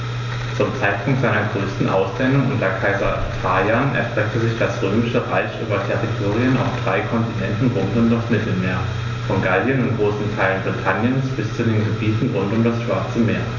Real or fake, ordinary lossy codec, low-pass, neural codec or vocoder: real; none; 7.2 kHz; none